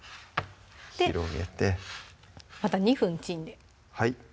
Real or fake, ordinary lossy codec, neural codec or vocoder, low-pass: real; none; none; none